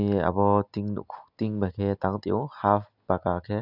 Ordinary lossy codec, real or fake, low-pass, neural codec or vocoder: none; real; 5.4 kHz; none